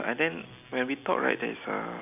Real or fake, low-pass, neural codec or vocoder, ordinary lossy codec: real; 3.6 kHz; none; none